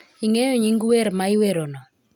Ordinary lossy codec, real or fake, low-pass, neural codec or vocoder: none; real; 19.8 kHz; none